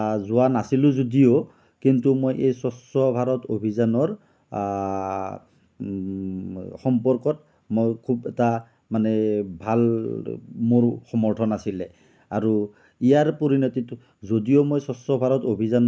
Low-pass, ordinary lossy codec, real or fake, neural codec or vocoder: none; none; real; none